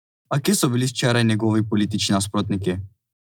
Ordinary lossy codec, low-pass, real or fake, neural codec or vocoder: none; none; real; none